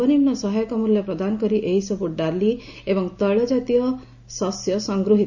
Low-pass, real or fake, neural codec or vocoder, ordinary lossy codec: 7.2 kHz; real; none; none